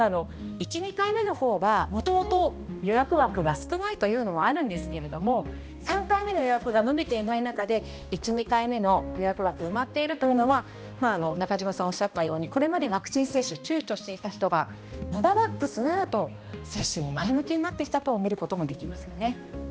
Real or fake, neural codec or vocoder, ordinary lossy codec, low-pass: fake; codec, 16 kHz, 1 kbps, X-Codec, HuBERT features, trained on balanced general audio; none; none